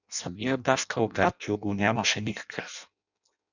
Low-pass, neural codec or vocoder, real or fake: 7.2 kHz; codec, 16 kHz in and 24 kHz out, 0.6 kbps, FireRedTTS-2 codec; fake